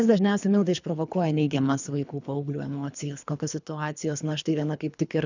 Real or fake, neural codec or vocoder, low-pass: fake; codec, 24 kHz, 3 kbps, HILCodec; 7.2 kHz